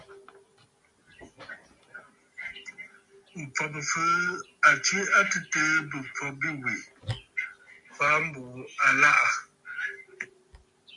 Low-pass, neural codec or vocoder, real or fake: 10.8 kHz; none; real